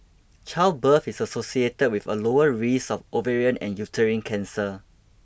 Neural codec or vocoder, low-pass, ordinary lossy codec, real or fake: none; none; none; real